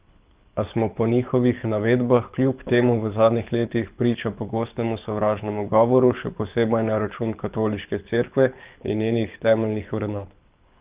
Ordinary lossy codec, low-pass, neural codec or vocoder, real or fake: Opus, 24 kbps; 3.6 kHz; codec, 24 kHz, 6 kbps, HILCodec; fake